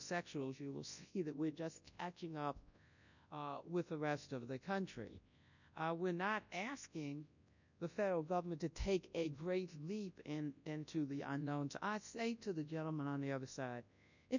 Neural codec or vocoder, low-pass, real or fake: codec, 24 kHz, 0.9 kbps, WavTokenizer, large speech release; 7.2 kHz; fake